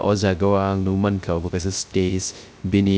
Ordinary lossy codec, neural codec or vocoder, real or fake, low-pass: none; codec, 16 kHz, 0.3 kbps, FocalCodec; fake; none